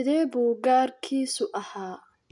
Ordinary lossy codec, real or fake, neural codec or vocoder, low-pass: none; real; none; 10.8 kHz